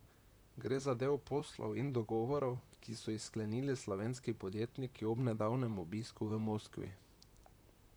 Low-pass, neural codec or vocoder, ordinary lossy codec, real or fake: none; vocoder, 44.1 kHz, 128 mel bands, Pupu-Vocoder; none; fake